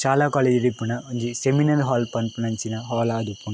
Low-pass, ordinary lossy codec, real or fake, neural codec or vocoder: none; none; real; none